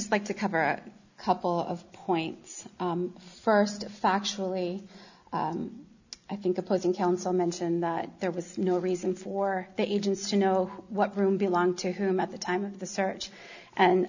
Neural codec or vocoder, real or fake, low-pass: none; real; 7.2 kHz